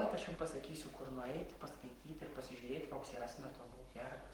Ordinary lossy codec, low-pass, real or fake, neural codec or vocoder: Opus, 24 kbps; 19.8 kHz; fake; codec, 44.1 kHz, 7.8 kbps, Pupu-Codec